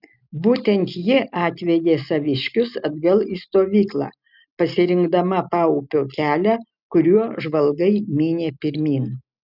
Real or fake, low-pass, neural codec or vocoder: real; 5.4 kHz; none